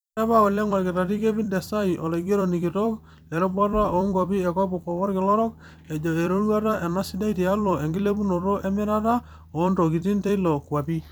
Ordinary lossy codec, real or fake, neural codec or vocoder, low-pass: none; fake; vocoder, 44.1 kHz, 128 mel bands every 256 samples, BigVGAN v2; none